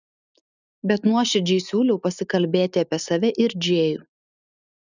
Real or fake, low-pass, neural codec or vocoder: real; 7.2 kHz; none